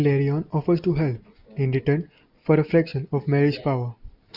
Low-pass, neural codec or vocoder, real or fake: 5.4 kHz; none; real